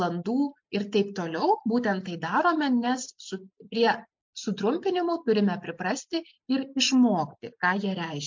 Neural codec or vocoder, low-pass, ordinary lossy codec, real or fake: none; 7.2 kHz; MP3, 48 kbps; real